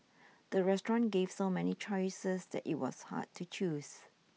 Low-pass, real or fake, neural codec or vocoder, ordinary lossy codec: none; real; none; none